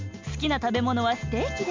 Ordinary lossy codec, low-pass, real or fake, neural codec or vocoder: MP3, 64 kbps; 7.2 kHz; real; none